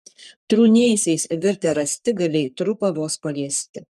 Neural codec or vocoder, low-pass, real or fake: codec, 44.1 kHz, 3.4 kbps, Pupu-Codec; 14.4 kHz; fake